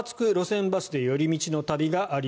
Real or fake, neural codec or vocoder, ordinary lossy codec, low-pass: real; none; none; none